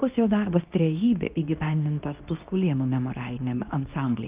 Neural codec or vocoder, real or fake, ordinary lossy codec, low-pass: codec, 24 kHz, 0.9 kbps, WavTokenizer, medium speech release version 2; fake; Opus, 32 kbps; 3.6 kHz